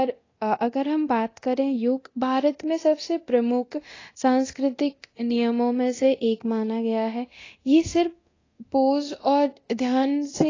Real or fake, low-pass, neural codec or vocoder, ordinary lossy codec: fake; 7.2 kHz; codec, 24 kHz, 0.9 kbps, DualCodec; AAC, 32 kbps